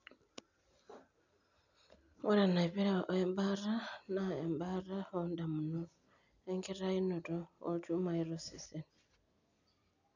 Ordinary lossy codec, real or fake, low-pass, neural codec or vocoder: none; real; 7.2 kHz; none